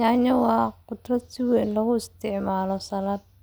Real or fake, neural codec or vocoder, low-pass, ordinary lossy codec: real; none; none; none